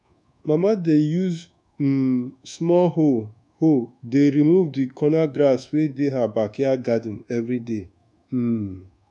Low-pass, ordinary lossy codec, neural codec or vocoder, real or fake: 10.8 kHz; none; codec, 24 kHz, 1.2 kbps, DualCodec; fake